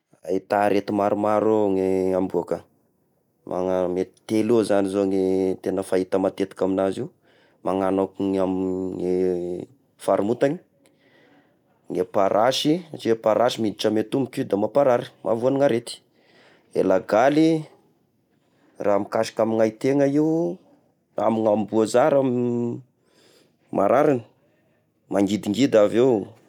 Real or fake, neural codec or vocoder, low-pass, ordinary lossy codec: real; none; 19.8 kHz; none